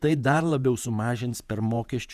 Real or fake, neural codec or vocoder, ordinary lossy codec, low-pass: fake; vocoder, 48 kHz, 128 mel bands, Vocos; Opus, 64 kbps; 14.4 kHz